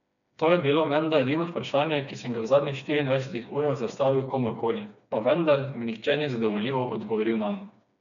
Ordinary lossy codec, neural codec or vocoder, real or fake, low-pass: none; codec, 16 kHz, 2 kbps, FreqCodec, smaller model; fake; 7.2 kHz